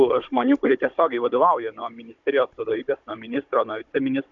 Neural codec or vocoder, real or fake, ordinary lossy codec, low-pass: codec, 16 kHz, 16 kbps, FunCodec, trained on Chinese and English, 50 frames a second; fake; MP3, 64 kbps; 7.2 kHz